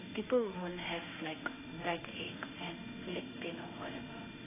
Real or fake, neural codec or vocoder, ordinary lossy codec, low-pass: fake; autoencoder, 48 kHz, 32 numbers a frame, DAC-VAE, trained on Japanese speech; AAC, 16 kbps; 3.6 kHz